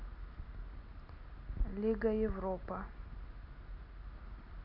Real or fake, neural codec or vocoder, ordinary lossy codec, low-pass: real; none; none; 5.4 kHz